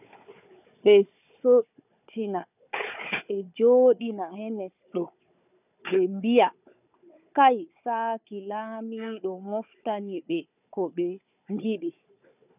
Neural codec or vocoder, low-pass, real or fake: codec, 16 kHz, 4 kbps, FunCodec, trained on Chinese and English, 50 frames a second; 3.6 kHz; fake